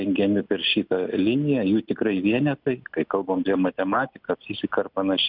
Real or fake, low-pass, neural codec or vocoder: real; 5.4 kHz; none